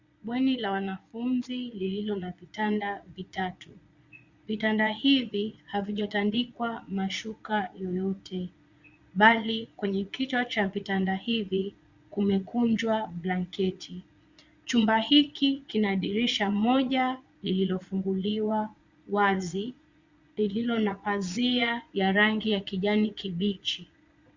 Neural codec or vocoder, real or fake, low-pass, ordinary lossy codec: vocoder, 22.05 kHz, 80 mel bands, Vocos; fake; 7.2 kHz; Opus, 64 kbps